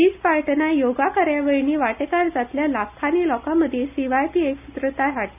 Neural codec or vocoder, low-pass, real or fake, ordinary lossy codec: none; 3.6 kHz; real; none